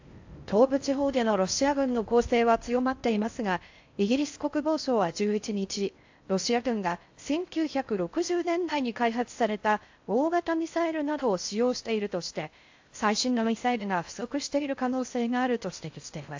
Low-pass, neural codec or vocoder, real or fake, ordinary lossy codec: 7.2 kHz; codec, 16 kHz in and 24 kHz out, 0.6 kbps, FocalCodec, streaming, 4096 codes; fake; AAC, 48 kbps